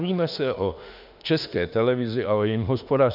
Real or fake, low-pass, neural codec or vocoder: fake; 5.4 kHz; autoencoder, 48 kHz, 32 numbers a frame, DAC-VAE, trained on Japanese speech